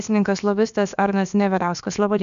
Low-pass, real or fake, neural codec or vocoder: 7.2 kHz; fake; codec, 16 kHz, about 1 kbps, DyCAST, with the encoder's durations